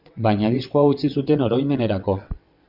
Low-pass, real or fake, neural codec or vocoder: 5.4 kHz; fake; vocoder, 22.05 kHz, 80 mel bands, WaveNeXt